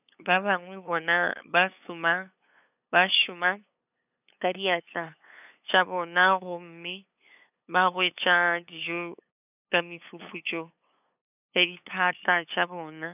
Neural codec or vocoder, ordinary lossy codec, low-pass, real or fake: codec, 16 kHz, 8 kbps, FunCodec, trained on LibriTTS, 25 frames a second; none; 3.6 kHz; fake